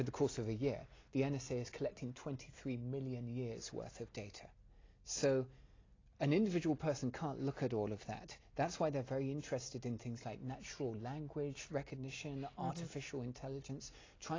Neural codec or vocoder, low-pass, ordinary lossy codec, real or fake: none; 7.2 kHz; AAC, 32 kbps; real